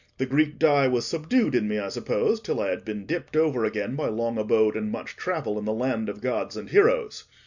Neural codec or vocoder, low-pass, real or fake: none; 7.2 kHz; real